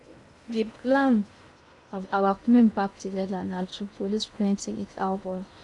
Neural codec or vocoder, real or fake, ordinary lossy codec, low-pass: codec, 16 kHz in and 24 kHz out, 0.6 kbps, FocalCodec, streaming, 2048 codes; fake; none; 10.8 kHz